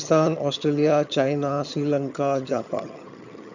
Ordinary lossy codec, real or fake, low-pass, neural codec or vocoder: none; fake; 7.2 kHz; vocoder, 22.05 kHz, 80 mel bands, HiFi-GAN